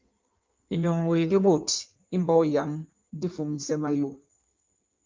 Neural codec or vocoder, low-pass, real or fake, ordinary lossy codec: codec, 16 kHz in and 24 kHz out, 1.1 kbps, FireRedTTS-2 codec; 7.2 kHz; fake; Opus, 32 kbps